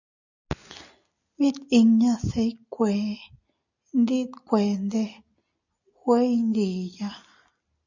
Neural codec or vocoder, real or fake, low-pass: none; real; 7.2 kHz